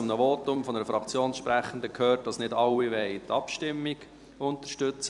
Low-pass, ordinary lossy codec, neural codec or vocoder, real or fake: 10.8 kHz; none; vocoder, 44.1 kHz, 128 mel bands every 256 samples, BigVGAN v2; fake